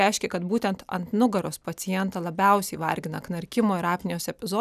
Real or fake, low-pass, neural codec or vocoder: real; 14.4 kHz; none